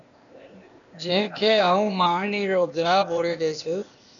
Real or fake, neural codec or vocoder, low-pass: fake; codec, 16 kHz, 0.8 kbps, ZipCodec; 7.2 kHz